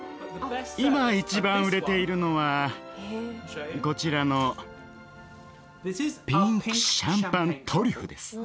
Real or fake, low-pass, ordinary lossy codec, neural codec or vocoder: real; none; none; none